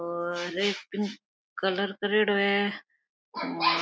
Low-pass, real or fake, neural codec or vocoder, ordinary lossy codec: none; real; none; none